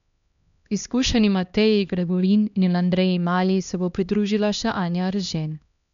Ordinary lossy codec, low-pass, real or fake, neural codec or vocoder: none; 7.2 kHz; fake; codec, 16 kHz, 1 kbps, X-Codec, HuBERT features, trained on LibriSpeech